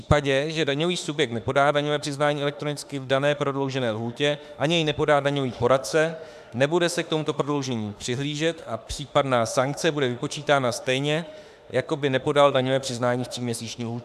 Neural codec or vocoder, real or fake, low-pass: autoencoder, 48 kHz, 32 numbers a frame, DAC-VAE, trained on Japanese speech; fake; 14.4 kHz